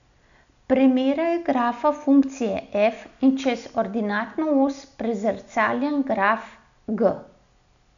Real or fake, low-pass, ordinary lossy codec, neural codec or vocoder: real; 7.2 kHz; none; none